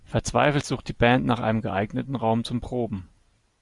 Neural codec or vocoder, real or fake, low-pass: none; real; 10.8 kHz